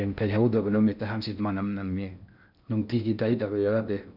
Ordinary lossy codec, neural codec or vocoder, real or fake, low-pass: none; codec, 16 kHz in and 24 kHz out, 0.6 kbps, FocalCodec, streaming, 4096 codes; fake; 5.4 kHz